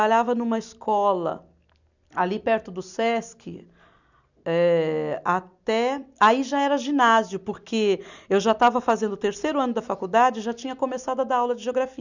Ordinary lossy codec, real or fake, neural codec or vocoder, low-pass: none; real; none; 7.2 kHz